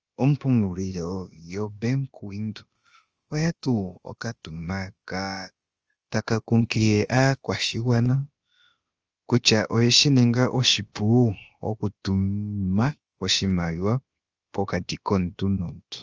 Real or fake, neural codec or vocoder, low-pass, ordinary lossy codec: fake; codec, 16 kHz, about 1 kbps, DyCAST, with the encoder's durations; 7.2 kHz; Opus, 24 kbps